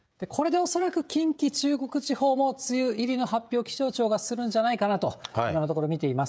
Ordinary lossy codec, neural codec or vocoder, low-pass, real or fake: none; codec, 16 kHz, 16 kbps, FreqCodec, smaller model; none; fake